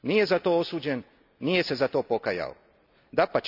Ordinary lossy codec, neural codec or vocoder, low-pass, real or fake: none; none; 5.4 kHz; real